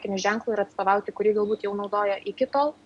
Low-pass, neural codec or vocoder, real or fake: 10.8 kHz; none; real